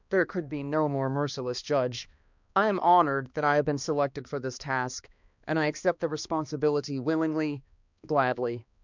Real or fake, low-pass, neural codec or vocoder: fake; 7.2 kHz; codec, 16 kHz, 2 kbps, X-Codec, HuBERT features, trained on balanced general audio